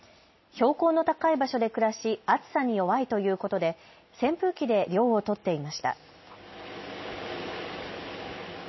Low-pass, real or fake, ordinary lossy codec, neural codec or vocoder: 7.2 kHz; real; MP3, 24 kbps; none